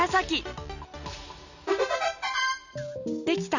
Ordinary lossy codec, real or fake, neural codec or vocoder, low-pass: none; real; none; 7.2 kHz